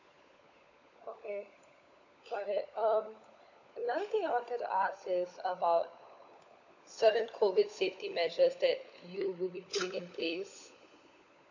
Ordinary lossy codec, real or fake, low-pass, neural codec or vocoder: none; fake; 7.2 kHz; codec, 16 kHz, 16 kbps, FunCodec, trained on LibriTTS, 50 frames a second